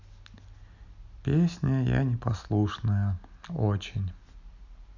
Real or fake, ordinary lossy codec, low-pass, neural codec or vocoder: real; none; 7.2 kHz; none